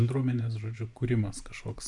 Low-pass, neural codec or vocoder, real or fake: 10.8 kHz; vocoder, 44.1 kHz, 128 mel bands every 512 samples, BigVGAN v2; fake